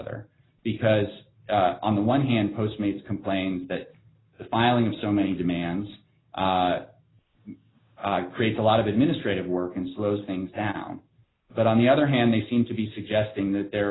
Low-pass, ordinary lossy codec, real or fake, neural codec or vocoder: 7.2 kHz; AAC, 16 kbps; real; none